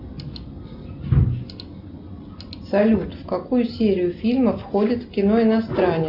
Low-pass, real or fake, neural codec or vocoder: 5.4 kHz; real; none